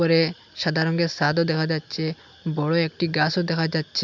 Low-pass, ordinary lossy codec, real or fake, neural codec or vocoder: 7.2 kHz; none; real; none